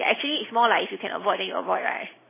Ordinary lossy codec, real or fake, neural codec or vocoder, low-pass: MP3, 16 kbps; real; none; 3.6 kHz